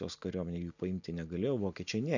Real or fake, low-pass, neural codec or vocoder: real; 7.2 kHz; none